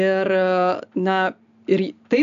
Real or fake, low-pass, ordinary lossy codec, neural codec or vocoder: real; 7.2 kHz; AAC, 96 kbps; none